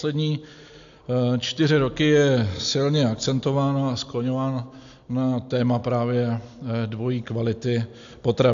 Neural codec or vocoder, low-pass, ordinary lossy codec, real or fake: none; 7.2 kHz; AAC, 64 kbps; real